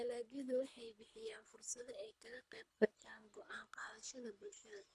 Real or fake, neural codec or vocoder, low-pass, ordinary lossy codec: fake; codec, 24 kHz, 3 kbps, HILCodec; none; none